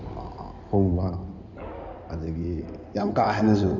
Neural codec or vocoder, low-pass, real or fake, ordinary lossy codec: codec, 16 kHz in and 24 kHz out, 2.2 kbps, FireRedTTS-2 codec; 7.2 kHz; fake; none